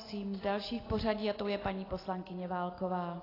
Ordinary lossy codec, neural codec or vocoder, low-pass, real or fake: AAC, 24 kbps; none; 5.4 kHz; real